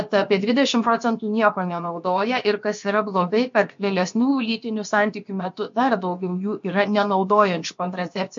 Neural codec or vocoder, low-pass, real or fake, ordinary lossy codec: codec, 16 kHz, about 1 kbps, DyCAST, with the encoder's durations; 7.2 kHz; fake; MP3, 48 kbps